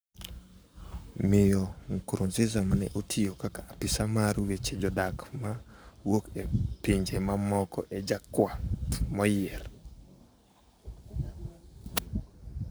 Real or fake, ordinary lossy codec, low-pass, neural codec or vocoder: fake; none; none; codec, 44.1 kHz, 7.8 kbps, Pupu-Codec